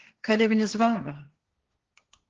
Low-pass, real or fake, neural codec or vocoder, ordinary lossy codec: 7.2 kHz; fake; codec, 16 kHz, 1.1 kbps, Voila-Tokenizer; Opus, 16 kbps